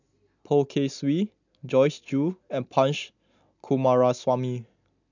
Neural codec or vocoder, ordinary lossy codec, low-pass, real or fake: none; none; 7.2 kHz; real